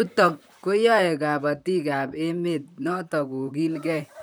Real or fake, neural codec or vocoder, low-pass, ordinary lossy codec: fake; vocoder, 44.1 kHz, 128 mel bands, Pupu-Vocoder; none; none